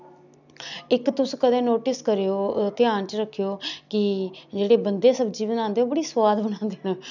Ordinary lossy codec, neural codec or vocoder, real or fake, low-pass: none; none; real; 7.2 kHz